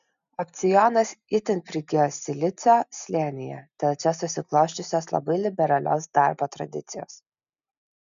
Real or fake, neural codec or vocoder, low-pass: real; none; 7.2 kHz